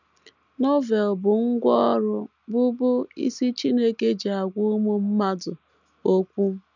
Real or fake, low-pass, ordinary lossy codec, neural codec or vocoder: real; 7.2 kHz; none; none